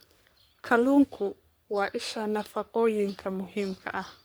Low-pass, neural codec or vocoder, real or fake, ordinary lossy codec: none; codec, 44.1 kHz, 3.4 kbps, Pupu-Codec; fake; none